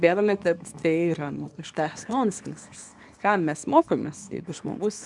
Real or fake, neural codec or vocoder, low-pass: fake; codec, 24 kHz, 0.9 kbps, WavTokenizer, small release; 10.8 kHz